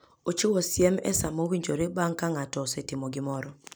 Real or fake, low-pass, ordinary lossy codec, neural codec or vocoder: real; none; none; none